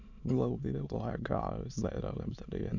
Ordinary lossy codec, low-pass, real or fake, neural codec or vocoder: none; 7.2 kHz; fake; autoencoder, 22.05 kHz, a latent of 192 numbers a frame, VITS, trained on many speakers